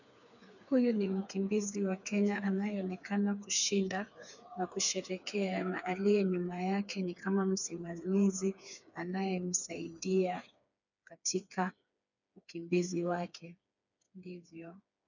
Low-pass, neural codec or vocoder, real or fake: 7.2 kHz; codec, 16 kHz, 4 kbps, FreqCodec, smaller model; fake